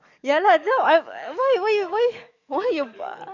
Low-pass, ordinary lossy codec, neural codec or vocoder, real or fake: 7.2 kHz; none; codec, 44.1 kHz, 7.8 kbps, DAC; fake